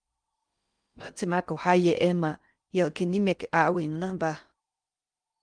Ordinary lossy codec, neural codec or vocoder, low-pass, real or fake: Opus, 32 kbps; codec, 16 kHz in and 24 kHz out, 0.6 kbps, FocalCodec, streaming, 4096 codes; 9.9 kHz; fake